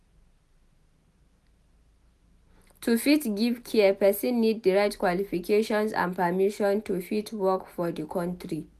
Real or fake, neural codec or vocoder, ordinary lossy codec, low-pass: real; none; none; 14.4 kHz